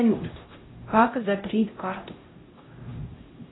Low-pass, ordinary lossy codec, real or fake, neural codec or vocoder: 7.2 kHz; AAC, 16 kbps; fake; codec, 16 kHz, 0.5 kbps, X-Codec, HuBERT features, trained on LibriSpeech